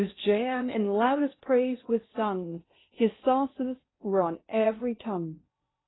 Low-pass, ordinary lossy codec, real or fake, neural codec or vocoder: 7.2 kHz; AAC, 16 kbps; fake; codec, 16 kHz in and 24 kHz out, 0.6 kbps, FocalCodec, streaming, 2048 codes